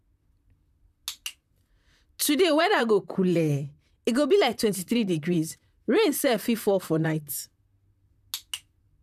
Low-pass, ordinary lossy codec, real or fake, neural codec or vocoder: 14.4 kHz; none; fake; vocoder, 44.1 kHz, 128 mel bands, Pupu-Vocoder